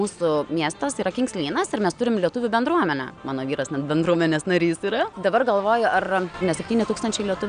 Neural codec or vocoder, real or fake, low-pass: none; real; 9.9 kHz